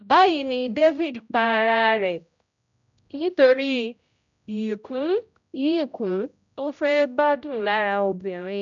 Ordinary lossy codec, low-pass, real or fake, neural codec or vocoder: none; 7.2 kHz; fake; codec, 16 kHz, 0.5 kbps, X-Codec, HuBERT features, trained on general audio